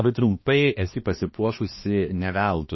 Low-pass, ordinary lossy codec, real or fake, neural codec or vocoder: 7.2 kHz; MP3, 24 kbps; fake; codec, 16 kHz, 2 kbps, X-Codec, HuBERT features, trained on balanced general audio